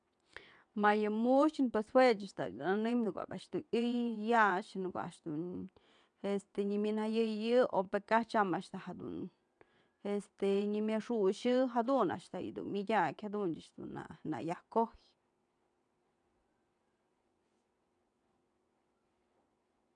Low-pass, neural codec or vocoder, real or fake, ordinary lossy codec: 9.9 kHz; vocoder, 22.05 kHz, 80 mel bands, WaveNeXt; fake; none